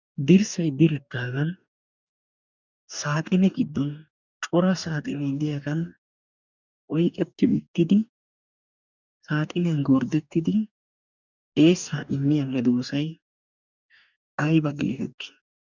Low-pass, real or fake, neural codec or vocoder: 7.2 kHz; fake; codec, 44.1 kHz, 2.6 kbps, DAC